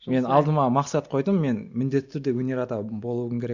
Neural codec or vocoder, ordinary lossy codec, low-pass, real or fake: none; Opus, 64 kbps; 7.2 kHz; real